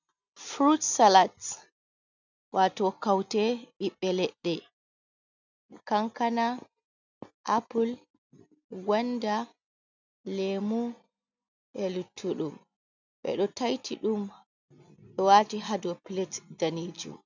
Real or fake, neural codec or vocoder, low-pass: real; none; 7.2 kHz